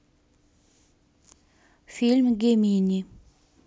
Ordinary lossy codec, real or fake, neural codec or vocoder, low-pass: none; real; none; none